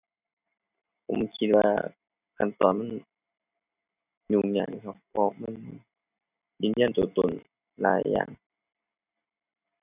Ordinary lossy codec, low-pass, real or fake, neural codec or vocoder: none; 3.6 kHz; real; none